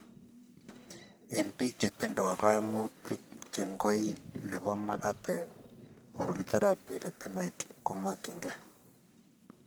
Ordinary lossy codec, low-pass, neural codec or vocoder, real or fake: none; none; codec, 44.1 kHz, 1.7 kbps, Pupu-Codec; fake